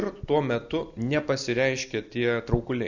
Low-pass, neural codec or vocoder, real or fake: 7.2 kHz; none; real